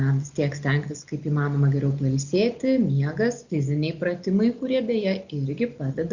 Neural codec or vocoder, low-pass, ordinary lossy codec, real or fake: none; 7.2 kHz; Opus, 64 kbps; real